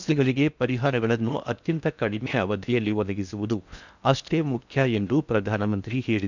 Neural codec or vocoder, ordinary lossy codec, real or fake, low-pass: codec, 16 kHz in and 24 kHz out, 0.6 kbps, FocalCodec, streaming, 4096 codes; none; fake; 7.2 kHz